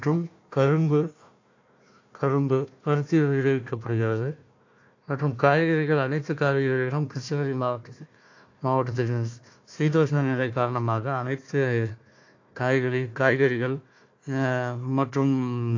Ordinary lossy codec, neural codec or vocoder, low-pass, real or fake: AAC, 48 kbps; codec, 16 kHz, 1 kbps, FunCodec, trained on Chinese and English, 50 frames a second; 7.2 kHz; fake